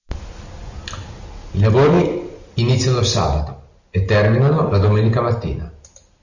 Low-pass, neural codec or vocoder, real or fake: 7.2 kHz; none; real